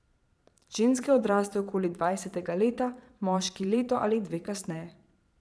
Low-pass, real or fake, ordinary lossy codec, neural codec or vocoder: none; fake; none; vocoder, 22.05 kHz, 80 mel bands, Vocos